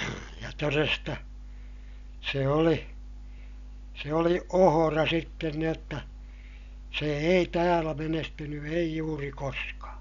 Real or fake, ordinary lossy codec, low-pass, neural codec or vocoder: real; none; 7.2 kHz; none